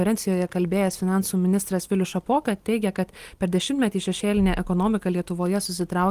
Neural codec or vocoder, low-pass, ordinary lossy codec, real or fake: none; 14.4 kHz; Opus, 24 kbps; real